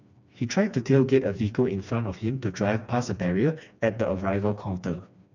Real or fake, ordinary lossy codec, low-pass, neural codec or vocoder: fake; none; 7.2 kHz; codec, 16 kHz, 2 kbps, FreqCodec, smaller model